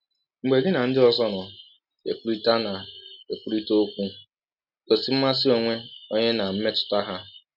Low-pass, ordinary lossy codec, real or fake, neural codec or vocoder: 5.4 kHz; none; real; none